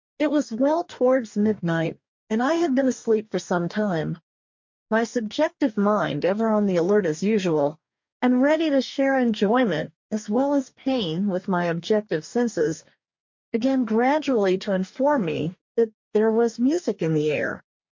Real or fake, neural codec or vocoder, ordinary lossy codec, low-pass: fake; codec, 44.1 kHz, 2.6 kbps, DAC; MP3, 48 kbps; 7.2 kHz